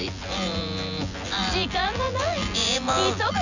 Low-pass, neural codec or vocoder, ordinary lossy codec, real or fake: 7.2 kHz; vocoder, 24 kHz, 100 mel bands, Vocos; none; fake